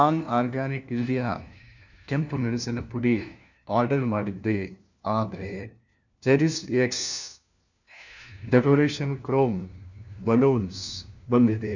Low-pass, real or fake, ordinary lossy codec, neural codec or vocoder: 7.2 kHz; fake; none; codec, 16 kHz, 1 kbps, FunCodec, trained on LibriTTS, 50 frames a second